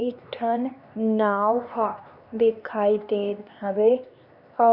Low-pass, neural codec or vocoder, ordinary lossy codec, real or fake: 5.4 kHz; codec, 16 kHz, 2 kbps, X-Codec, HuBERT features, trained on LibriSpeech; Opus, 64 kbps; fake